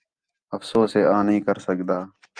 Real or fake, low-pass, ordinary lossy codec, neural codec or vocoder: real; 9.9 kHz; Opus, 32 kbps; none